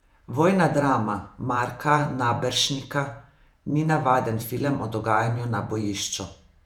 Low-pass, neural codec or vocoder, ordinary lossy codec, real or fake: 19.8 kHz; vocoder, 48 kHz, 128 mel bands, Vocos; none; fake